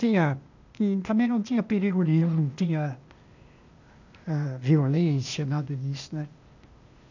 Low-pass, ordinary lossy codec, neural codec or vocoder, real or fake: 7.2 kHz; none; codec, 16 kHz, 0.8 kbps, ZipCodec; fake